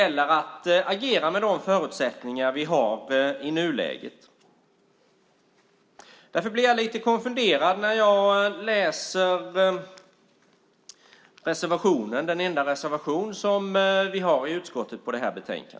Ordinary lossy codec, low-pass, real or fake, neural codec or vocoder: none; none; real; none